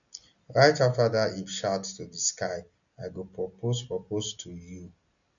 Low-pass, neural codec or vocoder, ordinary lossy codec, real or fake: 7.2 kHz; none; none; real